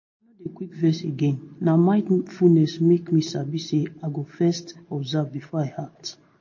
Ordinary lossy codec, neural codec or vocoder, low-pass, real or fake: MP3, 32 kbps; none; 7.2 kHz; real